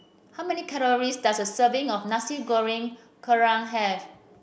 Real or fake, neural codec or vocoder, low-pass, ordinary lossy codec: real; none; none; none